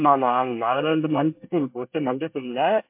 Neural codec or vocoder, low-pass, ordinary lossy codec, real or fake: codec, 24 kHz, 1 kbps, SNAC; 3.6 kHz; MP3, 32 kbps; fake